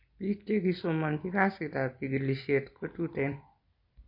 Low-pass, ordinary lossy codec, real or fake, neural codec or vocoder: 5.4 kHz; MP3, 32 kbps; real; none